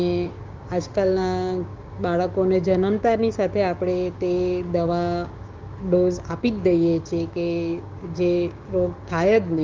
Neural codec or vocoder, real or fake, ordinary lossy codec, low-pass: codec, 16 kHz, 6 kbps, DAC; fake; Opus, 32 kbps; 7.2 kHz